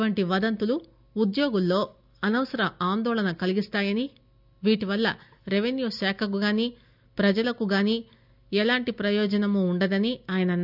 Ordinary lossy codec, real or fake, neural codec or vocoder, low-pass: none; real; none; 5.4 kHz